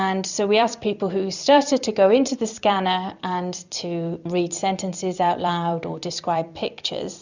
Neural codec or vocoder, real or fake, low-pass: vocoder, 44.1 kHz, 128 mel bands every 256 samples, BigVGAN v2; fake; 7.2 kHz